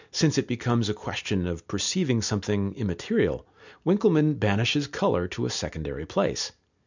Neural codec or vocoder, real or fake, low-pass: none; real; 7.2 kHz